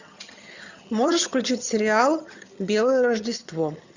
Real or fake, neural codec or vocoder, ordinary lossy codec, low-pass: fake; vocoder, 22.05 kHz, 80 mel bands, HiFi-GAN; Opus, 64 kbps; 7.2 kHz